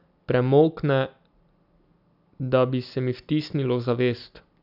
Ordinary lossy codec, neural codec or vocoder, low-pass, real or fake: none; none; 5.4 kHz; real